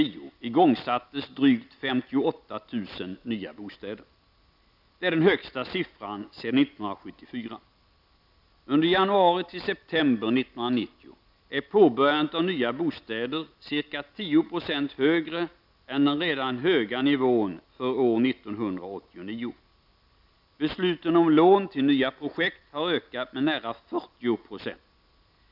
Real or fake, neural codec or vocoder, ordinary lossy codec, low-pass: real; none; none; 5.4 kHz